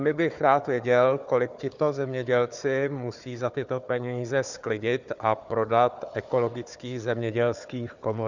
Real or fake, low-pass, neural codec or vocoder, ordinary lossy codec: fake; 7.2 kHz; codec, 16 kHz, 4 kbps, FunCodec, trained on Chinese and English, 50 frames a second; Opus, 64 kbps